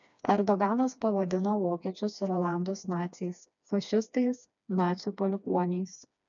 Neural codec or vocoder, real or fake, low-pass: codec, 16 kHz, 2 kbps, FreqCodec, smaller model; fake; 7.2 kHz